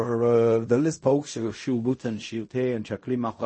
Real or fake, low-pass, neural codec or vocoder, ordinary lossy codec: fake; 9.9 kHz; codec, 16 kHz in and 24 kHz out, 0.4 kbps, LongCat-Audio-Codec, fine tuned four codebook decoder; MP3, 32 kbps